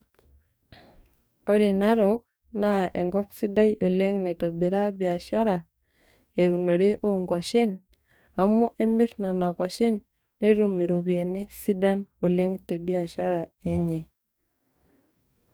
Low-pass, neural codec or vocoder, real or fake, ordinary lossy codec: none; codec, 44.1 kHz, 2.6 kbps, DAC; fake; none